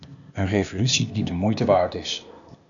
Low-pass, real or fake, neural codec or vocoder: 7.2 kHz; fake; codec, 16 kHz, 0.8 kbps, ZipCodec